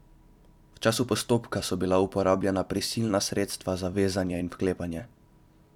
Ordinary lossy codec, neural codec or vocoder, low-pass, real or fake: none; vocoder, 44.1 kHz, 128 mel bands every 256 samples, BigVGAN v2; 19.8 kHz; fake